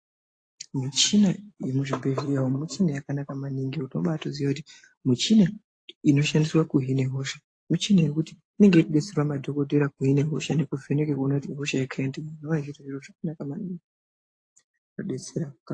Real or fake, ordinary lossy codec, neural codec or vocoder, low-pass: real; AAC, 48 kbps; none; 9.9 kHz